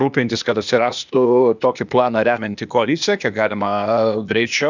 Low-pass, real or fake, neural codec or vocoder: 7.2 kHz; fake; codec, 16 kHz, 0.8 kbps, ZipCodec